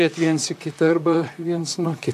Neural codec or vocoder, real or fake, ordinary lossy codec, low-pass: vocoder, 44.1 kHz, 128 mel bands, Pupu-Vocoder; fake; AAC, 96 kbps; 14.4 kHz